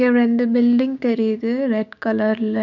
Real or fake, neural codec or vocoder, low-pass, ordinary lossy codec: fake; codec, 16 kHz, 6 kbps, DAC; 7.2 kHz; none